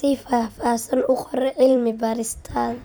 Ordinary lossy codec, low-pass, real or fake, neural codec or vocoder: none; none; fake; vocoder, 44.1 kHz, 128 mel bands every 256 samples, BigVGAN v2